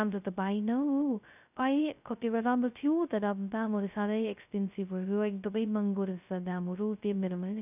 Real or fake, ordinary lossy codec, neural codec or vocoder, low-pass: fake; none; codec, 16 kHz, 0.2 kbps, FocalCodec; 3.6 kHz